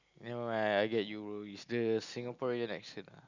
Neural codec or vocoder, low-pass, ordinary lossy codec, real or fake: none; 7.2 kHz; none; real